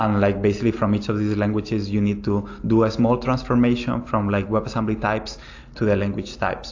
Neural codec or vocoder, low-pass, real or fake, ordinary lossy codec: none; 7.2 kHz; real; AAC, 48 kbps